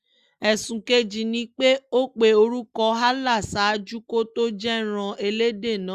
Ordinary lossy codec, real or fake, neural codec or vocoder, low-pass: Opus, 64 kbps; real; none; 14.4 kHz